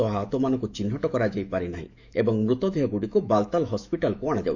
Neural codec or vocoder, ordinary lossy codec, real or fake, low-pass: autoencoder, 48 kHz, 128 numbers a frame, DAC-VAE, trained on Japanese speech; none; fake; 7.2 kHz